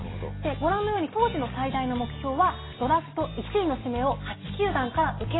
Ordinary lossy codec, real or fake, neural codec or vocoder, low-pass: AAC, 16 kbps; real; none; 7.2 kHz